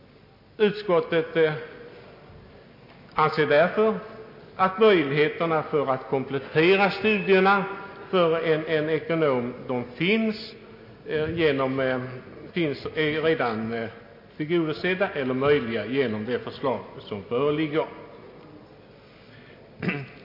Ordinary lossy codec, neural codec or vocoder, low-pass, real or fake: MP3, 32 kbps; none; 5.4 kHz; real